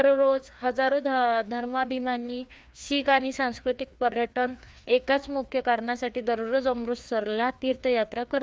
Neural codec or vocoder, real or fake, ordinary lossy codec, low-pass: codec, 16 kHz, 2 kbps, FreqCodec, larger model; fake; none; none